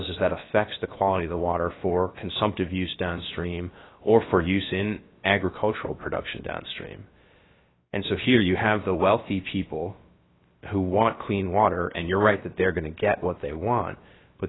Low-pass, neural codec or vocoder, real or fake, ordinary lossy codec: 7.2 kHz; codec, 16 kHz, about 1 kbps, DyCAST, with the encoder's durations; fake; AAC, 16 kbps